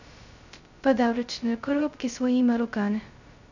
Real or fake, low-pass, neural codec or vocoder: fake; 7.2 kHz; codec, 16 kHz, 0.2 kbps, FocalCodec